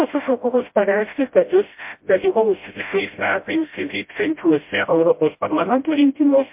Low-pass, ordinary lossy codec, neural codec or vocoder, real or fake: 3.6 kHz; MP3, 32 kbps; codec, 16 kHz, 0.5 kbps, FreqCodec, smaller model; fake